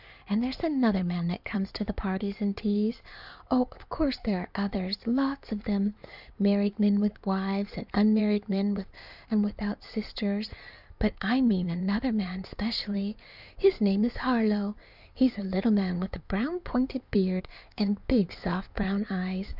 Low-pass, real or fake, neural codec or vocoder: 5.4 kHz; fake; codec, 16 kHz in and 24 kHz out, 2.2 kbps, FireRedTTS-2 codec